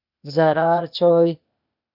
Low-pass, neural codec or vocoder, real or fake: 5.4 kHz; codec, 16 kHz, 0.8 kbps, ZipCodec; fake